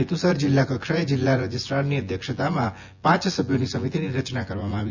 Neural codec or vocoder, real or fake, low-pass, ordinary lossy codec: vocoder, 24 kHz, 100 mel bands, Vocos; fake; 7.2 kHz; Opus, 64 kbps